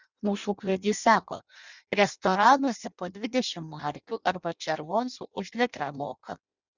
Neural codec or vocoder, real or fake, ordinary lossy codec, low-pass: codec, 16 kHz in and 24 kHz out, 0.6 kbps, FireRedTTS-2 codec; fake; Opus, 64 kbps; 7.2 kHz